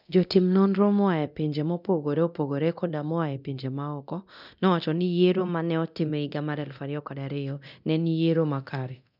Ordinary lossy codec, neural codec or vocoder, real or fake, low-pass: none; codec, 24 kHz, 0.9 kbps, DualCodec; fake; 5.4 kHz